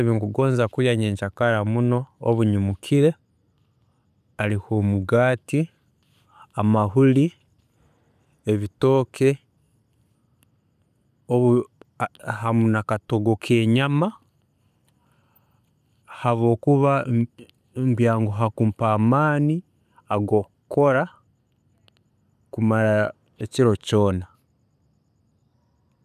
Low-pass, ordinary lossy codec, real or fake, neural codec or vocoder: 14.4 kHz; none; real; none